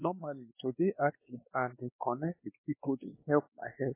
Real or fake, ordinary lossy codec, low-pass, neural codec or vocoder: fake; MP3, 16 kbps; 3.6 kHz; codec, 16 kHz, 2 kbps, X-Codec, WavLM features, trained on Multilingual LibriSpeech